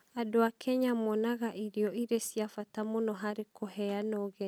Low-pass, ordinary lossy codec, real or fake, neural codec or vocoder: none; none; real; none